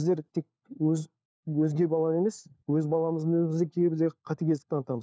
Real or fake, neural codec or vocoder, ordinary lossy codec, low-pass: fake; codec, 16 kHz, 4 kbps, FunCodec, trained on LibriTTS, 50 frames a second; none; none